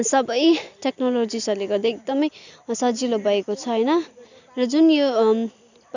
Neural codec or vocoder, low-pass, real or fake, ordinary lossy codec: none; 7.2 kHz; real; none